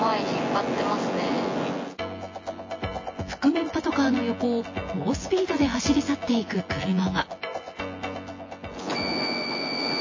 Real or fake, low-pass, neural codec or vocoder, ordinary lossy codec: fake; 7.2 kHz; vocoder, 24 kHz, 100 mel bands, Vocos; MP3, 32 kbps